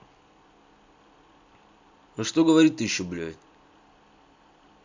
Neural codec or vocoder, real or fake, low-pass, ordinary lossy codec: none; real; 7.2 kHz; MP3, 64 kbps